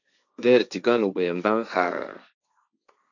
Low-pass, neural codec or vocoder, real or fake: 7.2 kHz; codec, 16 kHz, 1.1 kbps, Voila-Tokenizer; fake